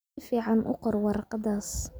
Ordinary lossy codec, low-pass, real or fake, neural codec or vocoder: none; none; real; none